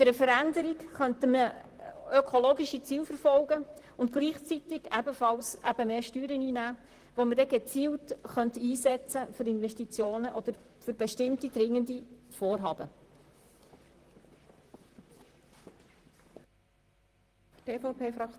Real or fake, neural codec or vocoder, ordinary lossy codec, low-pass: fake; vocoder, 44.1 kHz, 128 mel bands, Pupu-Vocoder; Opus, 24 kbps; 14.4 kHz